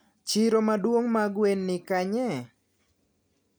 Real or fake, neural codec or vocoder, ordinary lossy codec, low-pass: real; none; none; none